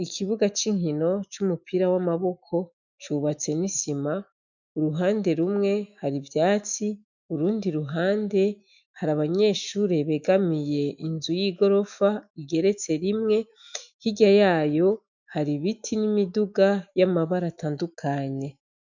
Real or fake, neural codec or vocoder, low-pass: fake; autoencoder, 48 kHz, 128 numbers a frame, DAC-VAE, trained on Japanese speech; 7.2 kHz